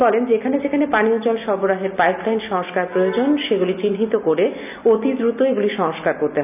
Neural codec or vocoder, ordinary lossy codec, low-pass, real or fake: none; none; 3.6 kHz; real